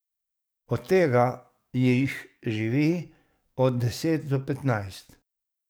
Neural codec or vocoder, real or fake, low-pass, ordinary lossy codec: codec, 44.1 kHz, 7.8 kbps, DAC; fake; none; none